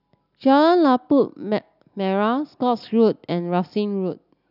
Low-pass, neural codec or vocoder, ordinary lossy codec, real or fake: 5.4 kHz; none; none; real